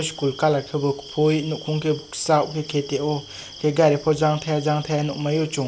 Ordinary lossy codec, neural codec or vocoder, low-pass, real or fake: none; none; none; real